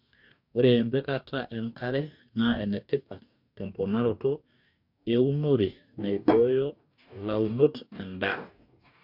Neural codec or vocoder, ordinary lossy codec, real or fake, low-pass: codec, 44.1 kHz, 2.6 kbps, DAC; MP3, 48 kbps; fake; 5.4 kHz